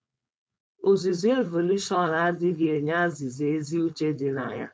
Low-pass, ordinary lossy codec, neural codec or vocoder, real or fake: none; none; codec, 16 kHz, 4.8 kbps, FACodec; fake